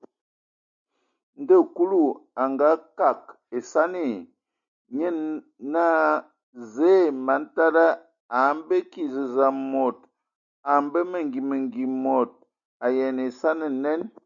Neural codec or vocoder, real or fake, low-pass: none; real; 7.2 kHz